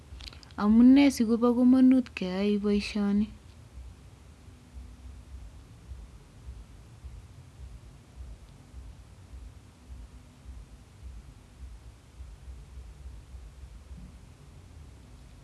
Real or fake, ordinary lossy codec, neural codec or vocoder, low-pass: real; none; none; none